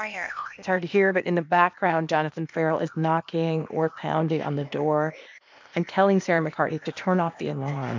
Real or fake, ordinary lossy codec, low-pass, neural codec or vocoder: fake; MP3, 64 kbps; 7.2 kHz; codec, 16 kHz, 0.8 kbps, ZipCodec